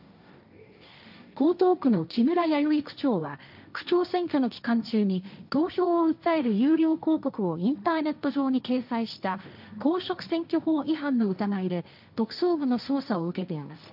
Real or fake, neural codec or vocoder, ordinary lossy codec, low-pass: fake; codec, 16 kHz, 1.1 kbps, Voila-Tokenizer; none; 5.4 kHz